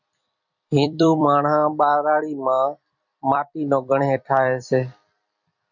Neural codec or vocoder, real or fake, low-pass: vocoder, 44.1 kHz, 128 mel bands every 256 samples, BigVGAN v2; fake; 7.2 kHz